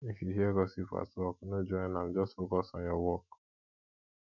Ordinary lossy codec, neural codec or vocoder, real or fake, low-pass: none; autoencoder, 48 kHz, 128 numbers a frame, DAC-VAE, trained on Japanese speech; fake; 7.2 kHz